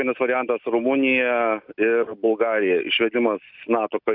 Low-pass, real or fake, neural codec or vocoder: 5.4 kHz; real; none